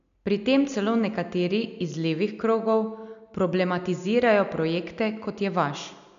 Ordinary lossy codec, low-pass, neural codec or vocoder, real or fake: none; 7.2 kHz; none; real